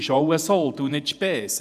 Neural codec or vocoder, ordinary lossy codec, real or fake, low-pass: vocoder, 48 kHz, 128 mel bands, Vocos; none; fake; 14.4 kHz